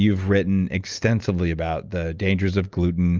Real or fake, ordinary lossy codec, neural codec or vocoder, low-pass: real; Opus, 24 kbps; none; 7.2 kHz